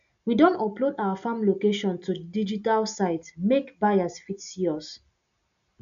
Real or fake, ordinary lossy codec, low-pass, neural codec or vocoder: real; none; 7.2 kHz; none